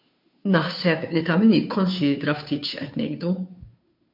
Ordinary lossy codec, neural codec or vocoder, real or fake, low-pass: MP3, 48 kbps; codec, 16 kHz, 2 kbps, FunCodec, trained on Chinese and English, 25 frames a second; fake; 5.4 kHz